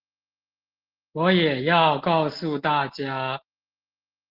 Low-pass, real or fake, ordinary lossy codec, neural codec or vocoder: 5.4 kHz; real; Opus, 16 kbps; none